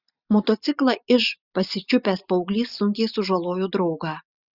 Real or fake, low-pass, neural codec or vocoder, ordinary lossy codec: real; 5.4 kHz; none; Opus, 64 kbps